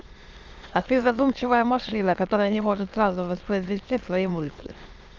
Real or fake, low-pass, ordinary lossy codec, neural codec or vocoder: fake; 7.2 kHz; Opus, 32 kbps; autoencoder, 22.05 kHz, a latent of 192 numbers a frame, VITS, trained on many speakers